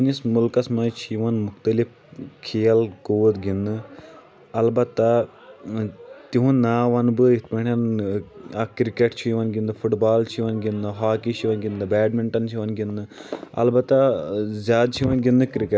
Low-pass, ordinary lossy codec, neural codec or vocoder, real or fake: none; none; none; real